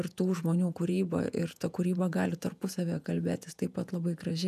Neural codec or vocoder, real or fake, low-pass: vocoder, 48 kHz, 128 mel bands, Vocos; fake; 14.4 kHz